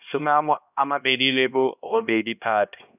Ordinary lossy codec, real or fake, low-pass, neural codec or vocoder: none; fake; 3.6 kHz; codec, 16 kHz, 1 kbps, X-Codec, HuBERT features, trained on LibriSpeech